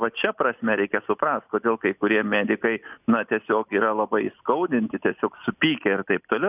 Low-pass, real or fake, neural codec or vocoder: 3.6 kHz; real; none